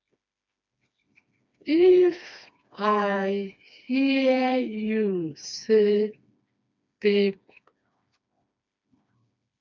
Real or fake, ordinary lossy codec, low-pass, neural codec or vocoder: fake; MP3, 64 kbps; 7.2 kHz; codec, 16 kHz, 2 kbps, FreqCodec, smaller model